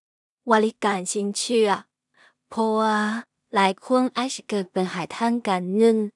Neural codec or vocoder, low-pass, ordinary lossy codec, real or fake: codec, 16 kHz in and 24 kHz out, 0.4 kbps, LongCat-Audio-Codec, two codebook decoder; 10.8 kHz; none; fake